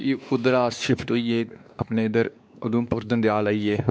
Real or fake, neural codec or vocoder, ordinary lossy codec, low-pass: fake; codec, 16 kHz, 2 kbps, X-Codec, WavLM features, trained on Multilingual LibriSpeech; none; none